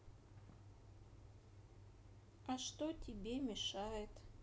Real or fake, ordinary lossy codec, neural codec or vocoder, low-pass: real; none; none; none